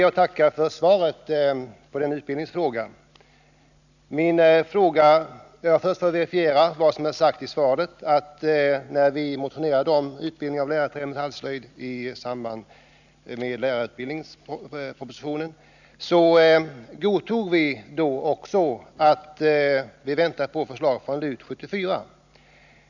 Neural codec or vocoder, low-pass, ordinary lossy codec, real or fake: none; 7.2 kHz; none; real